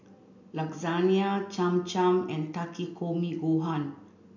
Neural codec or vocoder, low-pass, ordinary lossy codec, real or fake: none; 7.2 kHz; none; real